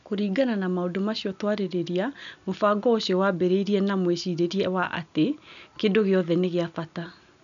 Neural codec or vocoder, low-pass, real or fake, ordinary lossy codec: none; 7.2 kHz; real; none